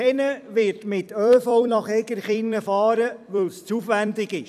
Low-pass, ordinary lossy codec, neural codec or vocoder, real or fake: 14.4 kHz; none; vocoder, 44.1 kHz, 128 mel bands every 256 samples, BigVGAN v2; fake